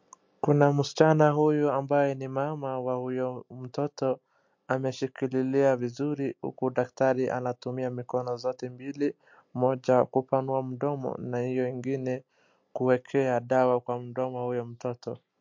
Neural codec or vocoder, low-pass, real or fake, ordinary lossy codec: none; 7.2 kHz; real; MP3, 48 kbps